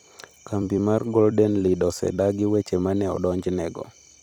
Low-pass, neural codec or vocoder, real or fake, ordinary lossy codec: 19.8 kHz; vocoder, 44.1 kHz, 128 mel bands every 256 samples, BigVGAN v2; fake; none